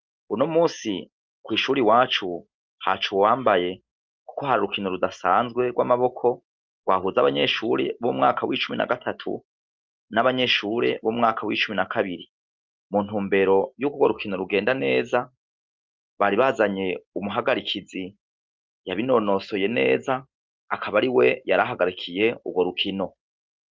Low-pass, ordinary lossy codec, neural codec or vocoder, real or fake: 7.2 kHz; Opus, 24 kbps; none; real